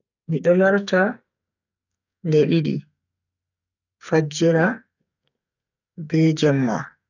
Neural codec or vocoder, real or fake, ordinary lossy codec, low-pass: codec, 44.1 kHz, 2.6 kbps, SNAC; fake; none; 7.2 kHz